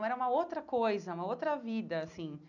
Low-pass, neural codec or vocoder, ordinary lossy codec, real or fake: 7.2 kHz; none; none; real